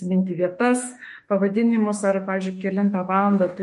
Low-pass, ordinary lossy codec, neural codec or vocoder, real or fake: 14.4 kHz; MP3, 48 kbps; autoencoder, 48 kHz, 32 numbers a frame, DAC-VAE, trained on Japanese speech; fake